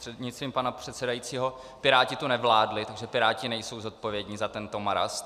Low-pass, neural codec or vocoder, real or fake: 14.4 kHz; none; real